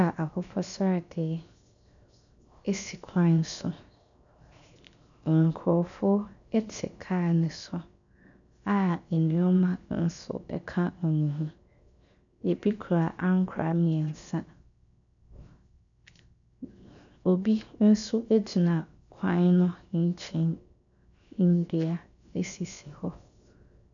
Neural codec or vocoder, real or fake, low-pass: codec, 16 kHz, 0.7 kbps, FocalCodec; fake; 7.2 kHz